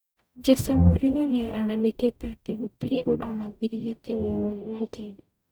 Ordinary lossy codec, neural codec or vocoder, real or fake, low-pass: none; codec, 44.1 kHz, 0.9 kbps, DAC; fake; none